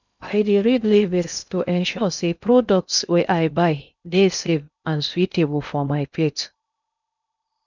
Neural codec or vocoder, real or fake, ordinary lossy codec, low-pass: codec, 16 kHz in and 24 kHz out, 0.6 kbps, FocalCodec, streaming, 2048 codes; fake; none; 7.2 kHz